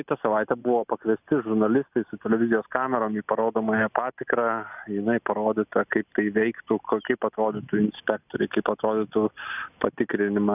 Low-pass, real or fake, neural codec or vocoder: 3.6 kHz; real; none